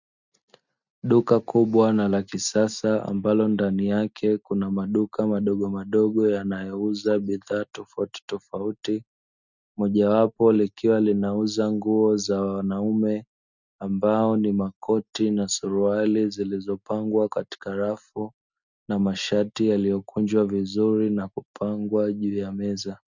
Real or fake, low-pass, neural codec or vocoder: real; 7.2 kHz; none